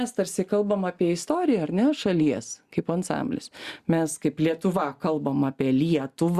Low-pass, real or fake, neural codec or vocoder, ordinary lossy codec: 14.4 kHz; real; none; Opus, 64 kbps